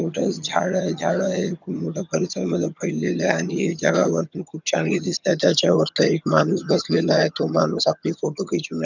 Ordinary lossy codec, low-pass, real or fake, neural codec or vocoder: none; 7.2 kHz; fake; vocoder, 22.05 kHz, 80 mel bands, HiFi-GAN